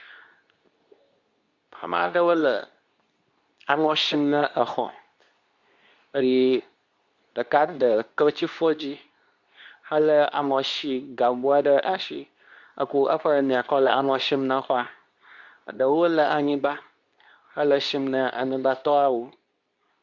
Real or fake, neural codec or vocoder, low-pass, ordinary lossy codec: fake; codec, 24 kHz, 0.9 kbps, WavTokenizer, medium speech release version 2; 7.2 kHz; Opus, 64 kbps